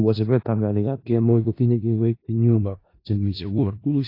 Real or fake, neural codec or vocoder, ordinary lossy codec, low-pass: fake; codec, 16 kHz in and 24 kHz out, 0.4 kbps, LongCat-Audio-Codec, four codebook decoder; AAC, 32 kbps; 5.4 kHz